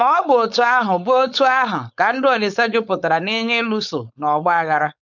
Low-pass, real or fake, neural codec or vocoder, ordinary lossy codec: 7.2 kHz; fake; codec, 16 kHz, 4.8 kbps, FACodec; none